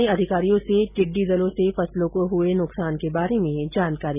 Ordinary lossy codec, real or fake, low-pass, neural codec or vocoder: none; real; 3.6 kHz; none